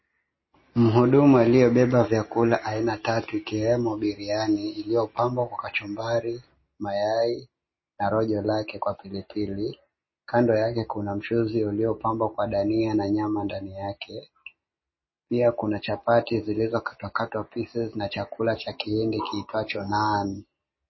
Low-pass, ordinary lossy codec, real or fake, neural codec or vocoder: 7.2 kHz; MP3, 24 kbps; real; none